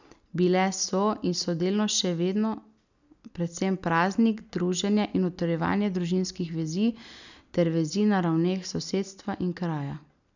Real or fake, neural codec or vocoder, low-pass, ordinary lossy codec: real; none; 7.2 kHz; none